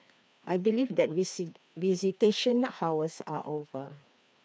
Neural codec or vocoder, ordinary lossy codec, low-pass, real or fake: codec, 16 kHz, 2 kbps, FreqCodec, larger model; none; none; fake